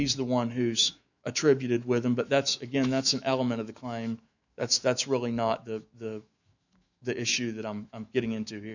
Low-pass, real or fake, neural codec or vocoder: 7.2 kHz; fake; autoencoder, 48 kHz, 128 numbers a frame, DAC-VAE, trained on Japanese speech